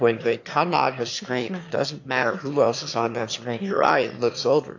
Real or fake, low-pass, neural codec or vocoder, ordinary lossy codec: fake; 7.2 kHz; autoencoder, 22.05 kHz, a latent of 192 numbers a frame, VITS, trained on one speaker; AAC, 48 kbps